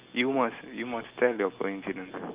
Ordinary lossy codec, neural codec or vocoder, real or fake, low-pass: Opus, 24 kbps; none; real; 3.6 kHz